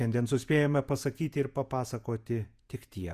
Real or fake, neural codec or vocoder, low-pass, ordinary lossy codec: fake; vocoder, 48 kHz, 128 mel bands, Vocos; 14.4 kHz; Opus, 64 kbps